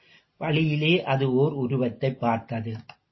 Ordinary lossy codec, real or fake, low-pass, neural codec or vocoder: MP3, 24 kbps; real; 7.2 kHz; none